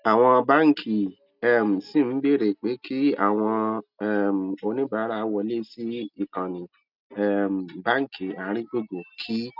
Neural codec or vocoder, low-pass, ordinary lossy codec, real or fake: none; 5.4 kHz; none; real